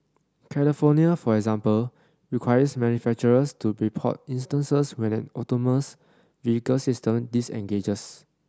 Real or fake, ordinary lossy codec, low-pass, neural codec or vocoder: real; none; none; none